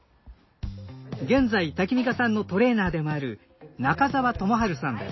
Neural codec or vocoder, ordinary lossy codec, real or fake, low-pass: none; MP3, 24 kbps; real; 7.2 kHz